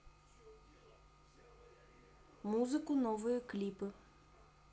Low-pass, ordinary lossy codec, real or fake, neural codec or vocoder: none; none; real; none